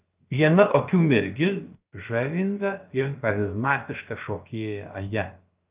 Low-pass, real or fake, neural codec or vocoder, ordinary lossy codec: 3.6 kHz; fake; codec, 16 kHz, about 1 kbps, DyCAST, with the encoder's durations; Opus, 24 kbps